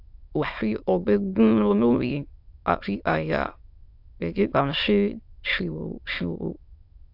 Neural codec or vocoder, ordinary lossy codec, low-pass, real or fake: autoencoder, 22.05 kHz, a latent of 192 numbers a frame, VITS, trained on many speakers; AAC, 48 kbps; 5.4 kHz; fake